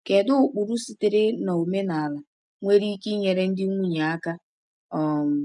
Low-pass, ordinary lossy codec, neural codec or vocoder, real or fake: 10.8 kHz; none; none; real